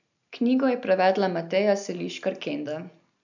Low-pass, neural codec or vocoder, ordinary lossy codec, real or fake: 7.2 kHz; none; none; real